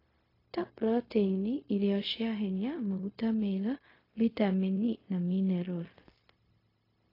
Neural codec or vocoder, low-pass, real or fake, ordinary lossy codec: codec, 16 kHz, 0.4 kbps, LongCat-Audio-Codec; 5.4 kHz; fake; AAC, 24 kbps